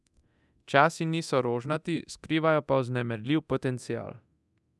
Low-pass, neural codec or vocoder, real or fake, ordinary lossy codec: none; codec, 24 kHz, 0.9 kbps, DualCodec; fake; none